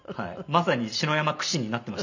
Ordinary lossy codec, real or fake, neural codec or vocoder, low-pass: none; real; none; 7.2 kHz